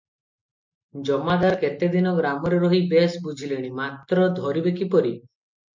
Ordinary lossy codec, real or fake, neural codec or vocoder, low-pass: MP3, 48 kbps; real; none; 7.2 kHz